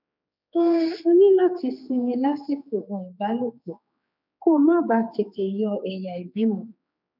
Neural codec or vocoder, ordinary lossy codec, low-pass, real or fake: codec, 16 kHz, 4 kbps, X-Codec, HuBERT features, trained on general audio; none; 5.4 kHz; fake